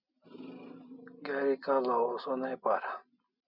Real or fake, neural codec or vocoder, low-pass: real; none; 5.4 kHz